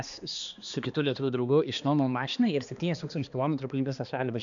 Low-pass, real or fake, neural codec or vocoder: 7.2 kHz; fake; codec, 16 kHz, 2 kbps, X-Codec, HuBERT features, trained on balanced general audio